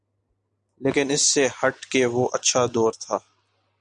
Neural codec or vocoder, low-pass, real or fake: none; 9.9 kHz; real